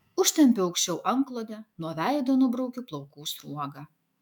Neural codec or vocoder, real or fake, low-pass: autoencoder, 48 kHz, 128 numbers a frame, DAC-VAE, trained on Japanese speech; fake; 19.8 kHz